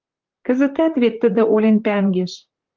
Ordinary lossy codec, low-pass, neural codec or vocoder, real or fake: Opus, 24 kbps; 7.2 kHz; vocoder, 44.1 kHz, 128 mel bands, Pupu-Vocoder; fake